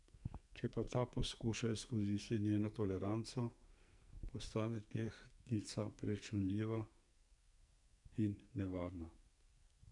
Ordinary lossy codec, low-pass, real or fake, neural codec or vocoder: none; 10.8 kHz; fake; codec, 44.1 kHz, 2.6 kbps, SNAC